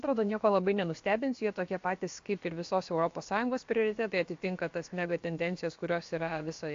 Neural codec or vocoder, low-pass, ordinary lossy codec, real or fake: codec, 16 kHz, about 1 kbps, DyCAST, with the encoder's durations; 7.2 kHz; AAC, 48 kbps; fake